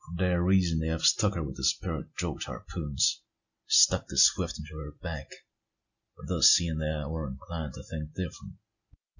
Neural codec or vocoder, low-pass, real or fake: none; 7.2 kHz; real